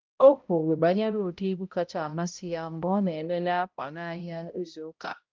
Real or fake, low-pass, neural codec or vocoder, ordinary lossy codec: fake; 7.2 kHz; codec, 16 kHz, 0.5 kbps, X-Codec, HuBERT features, trained on balanced general audio; Opus, 32 kbps